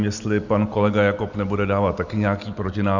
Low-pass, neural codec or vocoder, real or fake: 7.2 kHz; none; real